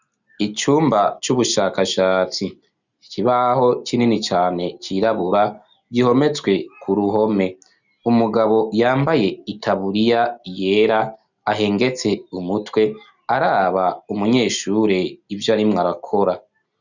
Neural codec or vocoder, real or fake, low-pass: none; real; 7.2 kHz